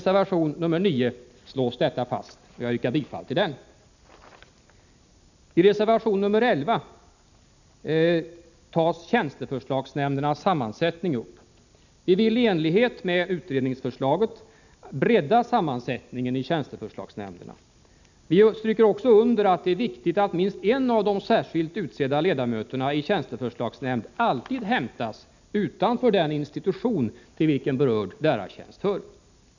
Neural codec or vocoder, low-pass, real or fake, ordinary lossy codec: none; 7.2 kHz; real; none